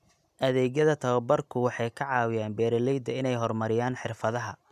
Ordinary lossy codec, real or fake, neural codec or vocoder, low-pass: none; real; none; 14.4 kHz